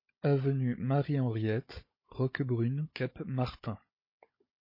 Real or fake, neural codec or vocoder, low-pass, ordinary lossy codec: fake; codec, 16 kHz, 16 kbps, FunCodec, trained on Chinese and English, 50 frames a second; 5.4 kHz; MP3, 24 kbps